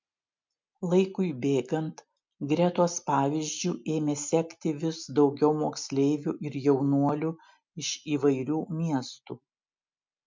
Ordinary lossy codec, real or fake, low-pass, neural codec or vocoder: MP3, 64 kbps; real; 7.2 kHz; none